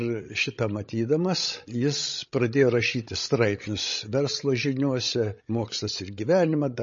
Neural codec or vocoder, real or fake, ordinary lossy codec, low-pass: codec, 16 kHz, 16 kbps, FreqCodec, larger model; fake; MP3, 32 kbps; 7.2 kHz